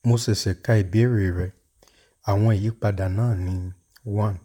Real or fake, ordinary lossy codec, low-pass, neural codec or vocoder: fake; none; 19.8 kHz; vocoder, 44.1 kHz, 128 mel bands, Pupu-Vocoder